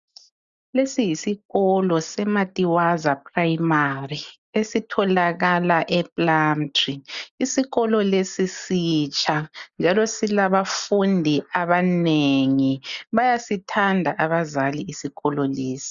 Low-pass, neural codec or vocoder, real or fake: 7.2 kHz; none; real